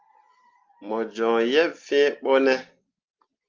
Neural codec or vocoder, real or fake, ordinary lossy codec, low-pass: none; real; Opus, 24 kbps; 7.2 kHz